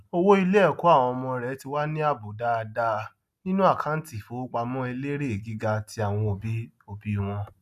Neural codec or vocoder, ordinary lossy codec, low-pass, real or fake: none; none; 14.4 kHz; real